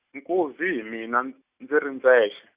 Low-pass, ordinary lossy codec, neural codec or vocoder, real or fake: 3.6 kHz; Opus, 64 kbps; none; real